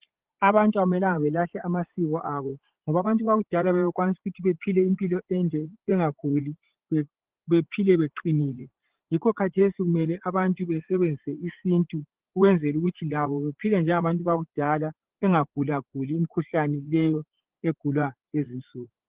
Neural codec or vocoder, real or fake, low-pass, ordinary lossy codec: vocoder, 44.1 kHz, 80 mel bands, Vocos; fake; 3.6 kHz; Opus, 16 kbps